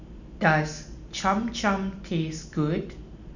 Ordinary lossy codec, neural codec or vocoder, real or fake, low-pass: none; none; real; 7.2 kHz